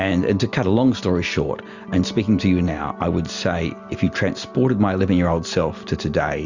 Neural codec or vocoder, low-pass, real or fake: none; 7.2 kHz; real